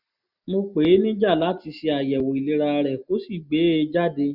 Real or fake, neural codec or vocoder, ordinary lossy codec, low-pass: real; none; none; 5.4 kHz